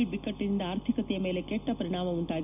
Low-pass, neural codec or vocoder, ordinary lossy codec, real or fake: 3.6 kHz; none; none; real